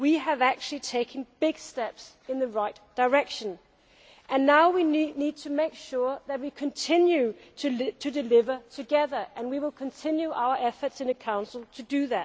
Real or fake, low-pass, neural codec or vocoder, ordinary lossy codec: real; none; none; none